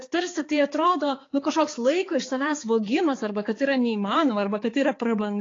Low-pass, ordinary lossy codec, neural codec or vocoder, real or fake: 7.2 kHz; AAC, 32 kbps; codec, 16 kHz, 4 kbps, X-Codec, HuBERT features, trained on balanced general audio; fake